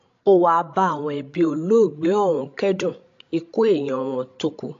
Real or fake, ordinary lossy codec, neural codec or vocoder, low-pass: fake; AAC, 48 kbps; codec, 16 kHz, 16 kbps, FreqCodec, larger model; 7.2 kHz